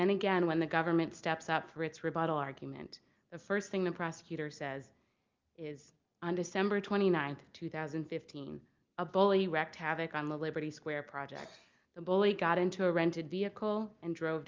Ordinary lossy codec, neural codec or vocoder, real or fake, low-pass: Opus, 24 kbps; none; real; 7.2 kHz